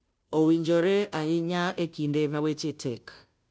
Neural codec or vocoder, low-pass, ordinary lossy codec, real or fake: codec, 16 kHz, 0.5 kbps, FunCodec, trained on Chinese and English, 25 frames a second; none; none; fake